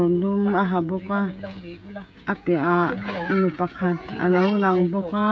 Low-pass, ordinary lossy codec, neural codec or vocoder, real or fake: none; none; codec, 16 kHz, 16 kbps, FreqCodec, smaller model; fake